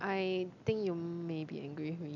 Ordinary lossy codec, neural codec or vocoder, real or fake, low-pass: none; none; real; 7.2 kHz